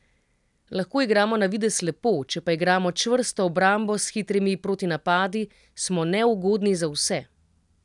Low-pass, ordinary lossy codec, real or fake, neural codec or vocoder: 10.8 kHz; none; real; none